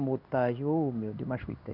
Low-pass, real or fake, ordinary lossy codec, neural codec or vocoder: 5.4 kHz; fake; none; codec, 16 kHz in and 24 kHz out, 1 kbps, XY-Tokenizer